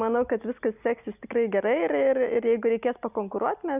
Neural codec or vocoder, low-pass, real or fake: none; 3.6 kHz; real